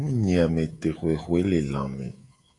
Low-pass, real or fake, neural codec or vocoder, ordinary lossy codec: 10.8 kHz; fake; codec, 24 kHz, 3.1 kbps, DualCodec; AAC, 32 kbps